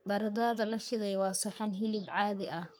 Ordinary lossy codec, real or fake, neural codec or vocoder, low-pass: none; fake; codec, 44.1 kHz, 3.4 kbps, Pupu-Codec; none